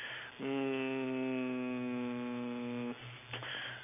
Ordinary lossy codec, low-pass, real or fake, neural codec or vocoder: none; 3.6 kHz; real; none